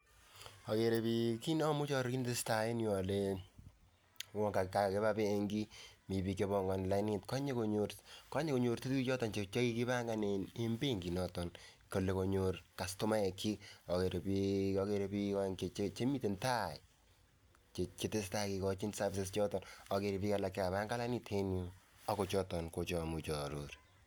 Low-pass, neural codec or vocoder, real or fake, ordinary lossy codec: none; none; real; none